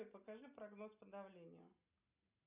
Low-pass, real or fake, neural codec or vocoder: 3.6 kHz; real; none